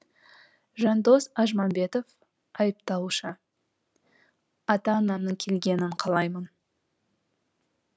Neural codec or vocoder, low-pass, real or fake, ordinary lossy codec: none; none; real; none